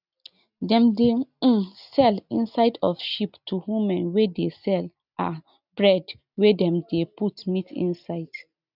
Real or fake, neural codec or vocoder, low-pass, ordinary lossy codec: real; none; 5.4 kHz; none